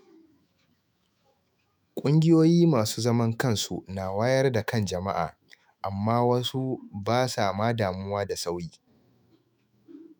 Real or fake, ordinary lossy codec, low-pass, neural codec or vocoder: fake; none; none; autoencoder, 48 kHz, 128 numbers a frame, DAC-VAE, trained on Japanese speech